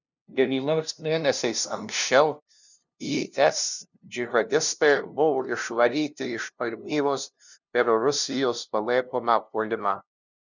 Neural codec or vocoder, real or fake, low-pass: codec, 16 kHz, 0.5 kbps, FunCodec, trained on LibriTTS, 25 frames a second; fake; 7.2 kHz